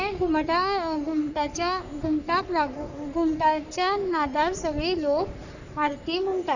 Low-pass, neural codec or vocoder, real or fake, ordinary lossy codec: 7.2 kHz; codec, 44.1 kHz, 3.4 kbps, Pupu-Codec; fake; none